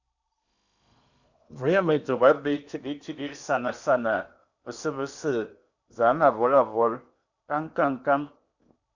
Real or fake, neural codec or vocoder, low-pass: fake; codec, 16 kHz in and 24 kHz out, 0.8 kbps, FocalCodec, streaming, 65536 codes; 7.2 kHz